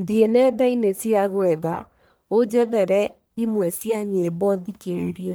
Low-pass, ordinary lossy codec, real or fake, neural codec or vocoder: none; none; fake; codec, 44.1 kHz, 1.7 kbps, Pupu-Codec